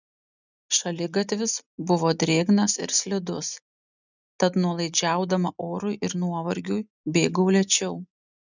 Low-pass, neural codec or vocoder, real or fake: 7.2 kHz; none; real